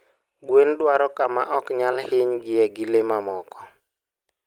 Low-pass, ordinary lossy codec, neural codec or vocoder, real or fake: 19.8 kHz; Opus, 32 kbps; vocoder, 44.1 kHz, 128 mel bands every 256 samples, BigVGAN v2; fake